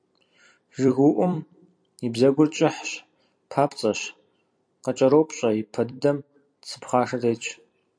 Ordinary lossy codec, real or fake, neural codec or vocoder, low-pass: AAC, 64 kbps; fake; vocoder, 44.1 kHz, 128 mel bands every 256 samples, BigVGAN v2; 9.9 kHz